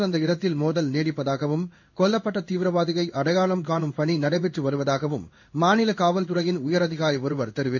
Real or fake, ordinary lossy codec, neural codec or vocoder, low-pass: fake; MP3, 48 kbps; codec, 16 kHz in and 24 kHz out, 1 kbps, XY-Tokenizer; 7.2 kHz